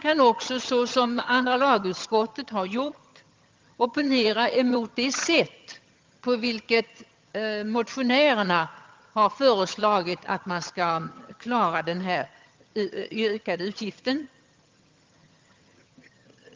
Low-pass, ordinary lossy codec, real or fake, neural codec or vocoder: 7.2 kHz; Opus, 32 kbps; fake; vocoder, 22.05 kHz, 80 mel bands, HiFi-GAN